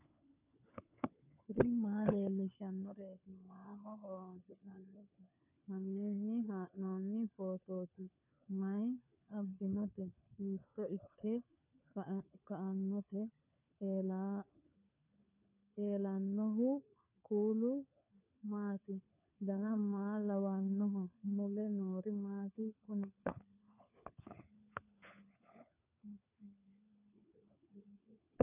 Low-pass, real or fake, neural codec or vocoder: 3.6 kHz; fake; codec, 16 kHz, 4 kbps, FunCodec, trained on LibriTTS, 50 frames a second